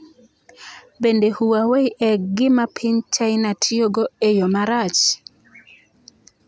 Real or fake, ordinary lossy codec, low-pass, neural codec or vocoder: real; none; none; none